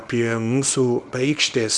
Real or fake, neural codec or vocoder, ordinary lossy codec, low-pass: fake; codec, 24 kHz, 0.9 kbps, WavTokenizer, small release; Opus, 64 kbps; 10.8 kHz